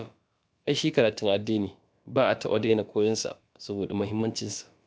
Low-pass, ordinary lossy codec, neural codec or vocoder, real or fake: none; none; codec, 16 kHz, about 1 kbps, DyCAST, with the encoder's durations; fake